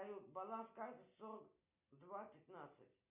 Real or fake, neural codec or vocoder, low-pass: real; none; 3.6 kHz